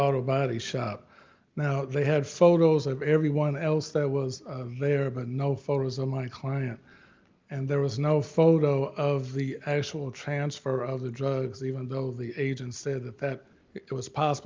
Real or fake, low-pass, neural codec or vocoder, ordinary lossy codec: real; 7.2 kHz; none; Opus, 24 kbps